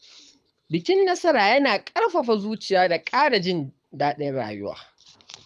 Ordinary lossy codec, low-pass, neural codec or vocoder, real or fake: none; none; codec, 24 kHz, 6 kbps, HILCodec; fake